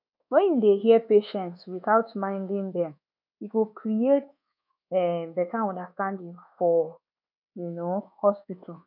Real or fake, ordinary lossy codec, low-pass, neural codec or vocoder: fake; none; 5.4 kHz; codec, 24 kHz, 1.2 kbps, DualCodec